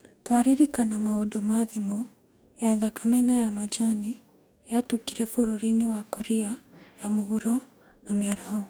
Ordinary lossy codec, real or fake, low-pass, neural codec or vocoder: none; fake; none; codec, 44.1 kHz, 2.6 kbps, DAC